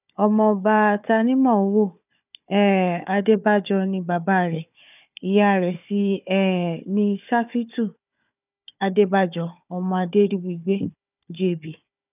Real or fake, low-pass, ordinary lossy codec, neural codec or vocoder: fake; 3.6 kHz; none; codec, 16 kHz, 4 kbps, FunCodec, trained on Chinese and English, 50 frames a second